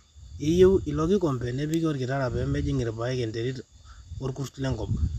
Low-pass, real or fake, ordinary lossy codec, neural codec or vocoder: 14.4 kHz; real; none; none